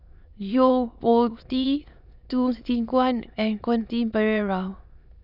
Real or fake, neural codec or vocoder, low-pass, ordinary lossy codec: fake; autoencoder, 22.05 kHz, a latent of 192 numbers a frame, VITS, trained on many speakers; 5.4 kHz; none